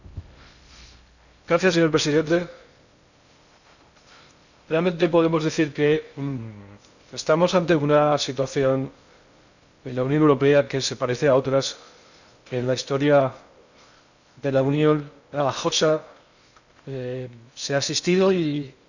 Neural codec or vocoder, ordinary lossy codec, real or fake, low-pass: codec, 16 kHz in and 24 kHz out, 0.6 kbps, FocalCodec, streaming, 2048 codes; none; fake; 7.2 kHz